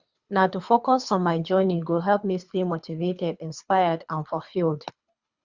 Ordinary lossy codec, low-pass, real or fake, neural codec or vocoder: Opus, 64 kbps; 7.2 kHz; fake; codec, 24 kHz, 3 kbps, HILCodec